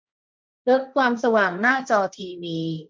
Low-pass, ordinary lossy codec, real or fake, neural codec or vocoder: none; none; fake; codec, 16 kHz, 1.1 kbps, Voila-Tokenizer